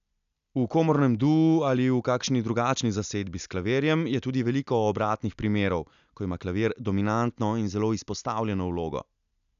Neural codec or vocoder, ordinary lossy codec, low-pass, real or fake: none; none; 7.2 kHz; real